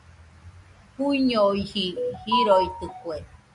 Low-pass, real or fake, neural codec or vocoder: 10.8 kHz; real; none